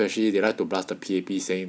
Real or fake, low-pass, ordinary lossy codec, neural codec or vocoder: real; none; none; none